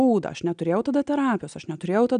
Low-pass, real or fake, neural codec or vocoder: 9.9 kHz; real; none